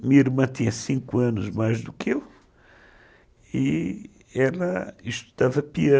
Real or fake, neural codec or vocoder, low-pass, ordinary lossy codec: real; none; none; none